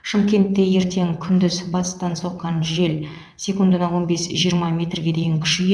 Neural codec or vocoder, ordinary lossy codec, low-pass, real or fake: vocoder, 22.05 kHz, 80 mel bands, WaveNeXt; none; none; fake